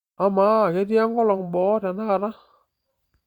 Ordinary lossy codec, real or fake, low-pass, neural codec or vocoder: Opus, 64 kbps; fake; 19.8 kHz; vocoder, 44.1 kHz, 128 mel bands every 512 samples, BigVGAN v2